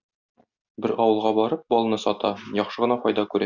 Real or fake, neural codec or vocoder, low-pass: real; none; 7.2 kHz